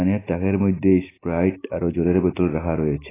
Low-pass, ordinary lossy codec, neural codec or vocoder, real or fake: 3.6 kHz; AAC, 16 kbps; none; real